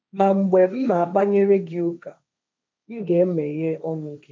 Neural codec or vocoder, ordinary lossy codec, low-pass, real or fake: codec, 16 kHz, 1.1 kbps, Voila-Tokenizer; none; none; fake